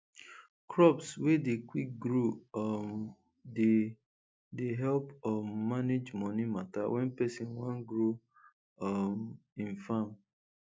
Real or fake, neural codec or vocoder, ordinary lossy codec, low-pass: real; none; none; none